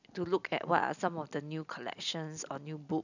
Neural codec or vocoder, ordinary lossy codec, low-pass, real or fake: none; none; 7.2 kHz; real